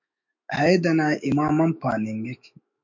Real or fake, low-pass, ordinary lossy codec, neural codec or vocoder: fake; 7.2 kHz; MP3, 48 kbps; autoencoder, 48 kHz, 128 numbers a frame, DAC-VAE, trained on Japanese speech